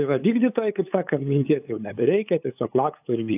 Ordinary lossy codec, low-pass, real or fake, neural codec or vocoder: AAC, 32 kbps; 3.6 kHz; fake; codec, 16 kHz, 8 kbps, FunCodec, trained on LibriTTS, 25 frames a second